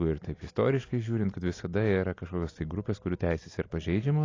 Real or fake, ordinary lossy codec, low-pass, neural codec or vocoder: real; AAC, 32 kbps; 7.2 kHz; none